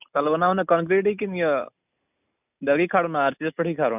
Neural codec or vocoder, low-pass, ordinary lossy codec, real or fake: none; 3.6 kHz; none; real